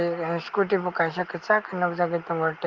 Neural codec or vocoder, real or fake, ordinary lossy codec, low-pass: none; real; Opus, 32 kbps; 7.2 kHz